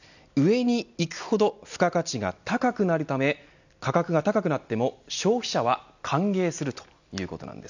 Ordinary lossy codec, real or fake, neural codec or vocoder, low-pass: none; real; none; 7.2 kHz